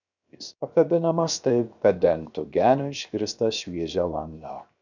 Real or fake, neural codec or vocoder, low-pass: fake; codec, 16 kHz, 0.7 kbps, FocalCodec; 7.2 kHz